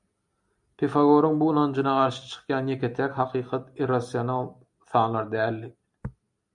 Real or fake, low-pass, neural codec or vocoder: real; 10.8 kHz; none